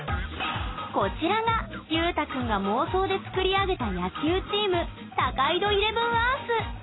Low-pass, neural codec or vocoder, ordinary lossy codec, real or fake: 7.2 kHz; none; AAC, 16 kbps; real